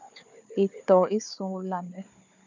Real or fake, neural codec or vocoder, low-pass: fake; codec, 16 kHz, 4 kbps, FunCodec, trained on Chinese and English, 50 frames a second; 7.2 kHz